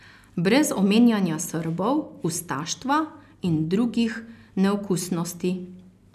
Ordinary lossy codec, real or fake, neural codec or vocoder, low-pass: none; real; none; 14.4 kHz